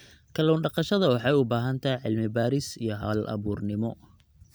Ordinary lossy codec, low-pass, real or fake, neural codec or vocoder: none; none; real; none